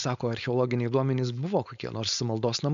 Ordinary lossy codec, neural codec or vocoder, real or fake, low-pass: MP3, 96 kbps; codec, 16 kHz, 4.8 kbps, FACodec; fake; 7.2 kHz